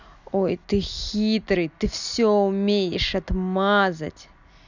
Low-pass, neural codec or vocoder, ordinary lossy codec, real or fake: 7.2 kHz; none; none; real